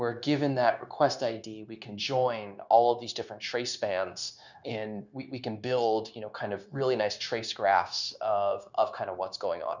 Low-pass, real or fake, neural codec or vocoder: 7.2 kHz; fake; codec, 16 kHz, 0.9 kbps, LongCat-Audio-Codec